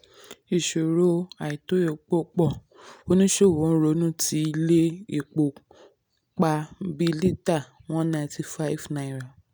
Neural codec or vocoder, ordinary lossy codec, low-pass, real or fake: none; none; none; real